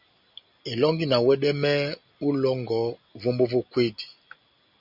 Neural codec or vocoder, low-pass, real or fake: none; 5.4 kHz; real